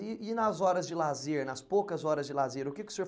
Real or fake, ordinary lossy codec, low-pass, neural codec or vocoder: real; none; none; none